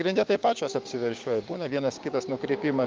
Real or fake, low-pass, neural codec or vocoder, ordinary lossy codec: fake; 7.2 kHz; codec, 16 kHz, 6 kbps, DAC; Opus, 32 kbps